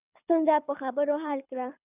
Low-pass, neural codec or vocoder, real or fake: 3.6 kHz; codec, 24 kHz, 6 kbps, HILCodec; fake